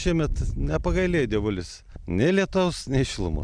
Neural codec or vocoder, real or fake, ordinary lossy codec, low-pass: none; real; Opus, 64 kbps; 9.9 kHz